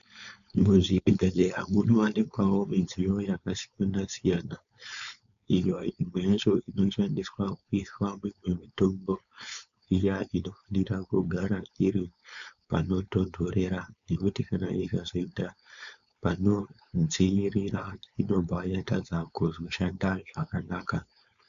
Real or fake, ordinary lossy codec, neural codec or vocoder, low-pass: fake; AAC, 96 kbps; codec, 16 kHz, 4.8 kbps, FACodec; 7.2 kHz